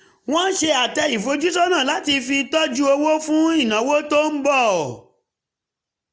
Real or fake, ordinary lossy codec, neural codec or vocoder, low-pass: real; none; none; none